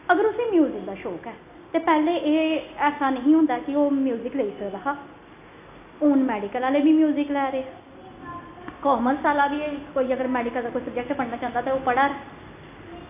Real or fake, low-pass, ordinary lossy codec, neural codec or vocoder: real; 3.6 kHz; AAC, 32 kbps; none